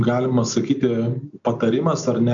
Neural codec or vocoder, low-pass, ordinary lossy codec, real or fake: none; 7.2 kHz; MP3, 64 kbps; real